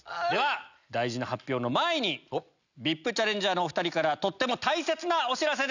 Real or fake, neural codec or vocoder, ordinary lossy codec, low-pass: real; none; none; 7.2 kHz